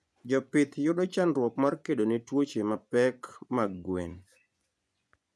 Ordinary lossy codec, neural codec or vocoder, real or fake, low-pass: none; vocoder, 24 kHz, 100 mel bands, Vocos; fake; none